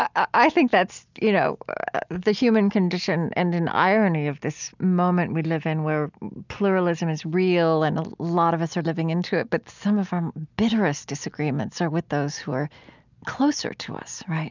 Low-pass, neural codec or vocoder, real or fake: 7.2 kHz; none; real